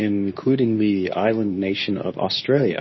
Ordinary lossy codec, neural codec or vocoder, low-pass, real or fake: MP3, 24 kbps; codec, 24 kHz, 0.9 kbps, WavTokenizer, medium speech release version 2; 7.2 kHz; fake